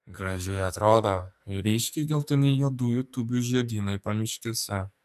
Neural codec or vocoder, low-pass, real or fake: codec, 44.1 kHz, 2.6 kbps, SNAC; 14.4 kHz; fake